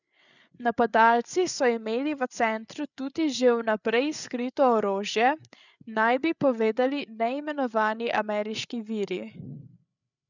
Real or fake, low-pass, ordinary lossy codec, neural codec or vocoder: fake; 7.2 kHz; none; codec, 16 kHz, 8 kbps, FreqCodec, larger model